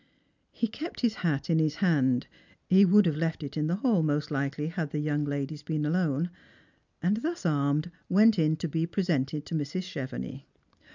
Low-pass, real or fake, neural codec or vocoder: 7.2 kHz; real; none